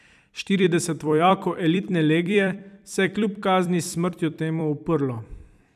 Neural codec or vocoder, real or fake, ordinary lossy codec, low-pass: vocoder, 44.1 kHz, 128 mel bands every 256 samples, BigVGAN v2; fake; none; 14.4 kHz